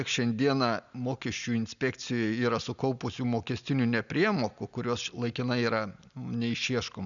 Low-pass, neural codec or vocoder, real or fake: 7.2 kHz; none; real